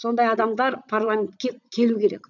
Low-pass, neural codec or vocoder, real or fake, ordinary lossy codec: 7.2 kHz; vocoder, 44.1 kHz, 80 mel bands, Vocos; fake; none